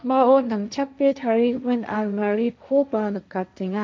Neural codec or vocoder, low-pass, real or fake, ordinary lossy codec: codec, 16 kHz, 1.1 kbps, Voila-Tokenizer; none; fake; none